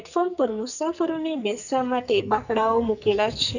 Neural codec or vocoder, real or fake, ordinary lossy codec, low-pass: codec, 44.1 kHz, 2.6 kbps, SNAC; fake; none; 7.2 kHz